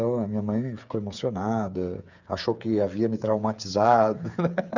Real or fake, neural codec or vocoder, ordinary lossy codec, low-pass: fake; codec, 16 kHz, 8 kbps, FreqCodec, smaller model; none; 7.2 kHz